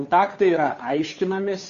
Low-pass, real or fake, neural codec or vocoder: 7.2 kHz; fake; codec, 16 kHz, 2 kbps, FunCodec, trained on Chinese and English, 25 frames a second